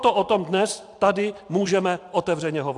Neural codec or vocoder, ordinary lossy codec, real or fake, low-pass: vocoder, 44.1 kHz, 128 mel bands every 512 samples, BigVGAN v2; AAC, 64 kbps; fake; 10.8 kHz